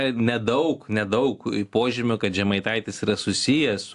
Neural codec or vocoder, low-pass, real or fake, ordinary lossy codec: none; 10.8 kHz; real; AAC, 64 kbps